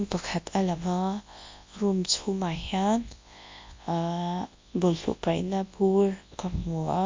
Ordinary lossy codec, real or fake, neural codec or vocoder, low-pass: MP3, 48 kbps; fake; codec, 24 kHz, 0.9 kbps, WavTokenizer, large speech release; 7.2 kHz